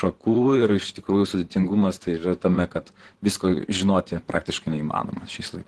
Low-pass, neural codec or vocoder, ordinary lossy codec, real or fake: 10.8 kHz; vocoder, 44.1 kHz, 128 mel bands, Pupu-Vocoder; Opus, 16 kbps; fake